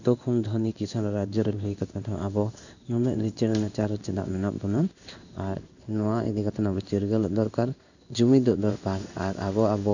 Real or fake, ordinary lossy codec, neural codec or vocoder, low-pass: fake; none; codec, 16 kHz in and 24 kHz out, 1 kbps, XY-Tokenizer; 7.2 kHz